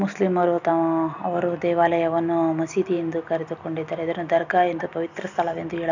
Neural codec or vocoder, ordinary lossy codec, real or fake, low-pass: none; none; real; 7.2 kHz